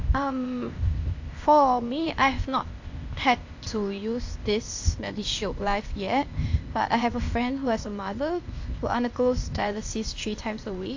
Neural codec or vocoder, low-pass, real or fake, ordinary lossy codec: codec, 16 kHz, 0.8 kbps, ZipCodec; 7.2 kHz; fake; AAC, 48 kbps